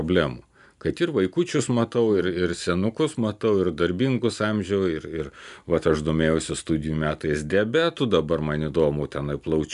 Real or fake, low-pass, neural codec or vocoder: real; 10.8 kHz; none